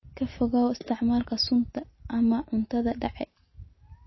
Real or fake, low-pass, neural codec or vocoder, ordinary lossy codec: real; 7.2 kHz; none; MP3, 24 kbps